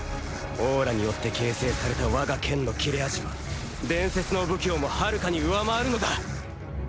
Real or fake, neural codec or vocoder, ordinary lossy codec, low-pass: real; none; none; none